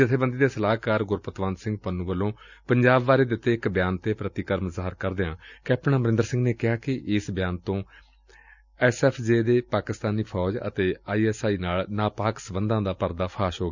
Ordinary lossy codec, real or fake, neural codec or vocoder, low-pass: none; real; none; 7.2 kHz